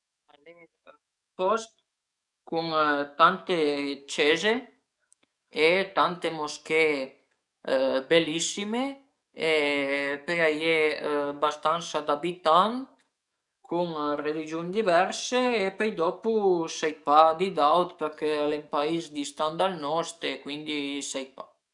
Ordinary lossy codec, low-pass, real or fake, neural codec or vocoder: none; 10.8 kHz; fake; codec, 44.1 kHz, 7.8 kbps, DAC